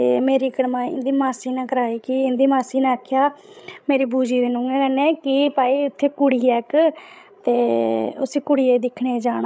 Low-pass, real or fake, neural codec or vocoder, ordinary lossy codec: none; fake; codec, 16 kHz, 16 kbps, FreqCodec, larger model; none